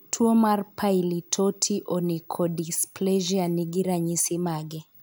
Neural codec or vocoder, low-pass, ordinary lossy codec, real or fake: none; none; none; real